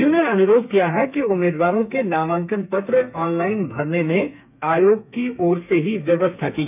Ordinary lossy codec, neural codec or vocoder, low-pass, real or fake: none; codec, 32 kHz, 1.9 kbps, SNAC; 3.6 kHz; fake